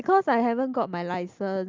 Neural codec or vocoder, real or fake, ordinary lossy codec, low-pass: none; real; Opus, 24 kbps; 7.2 kHz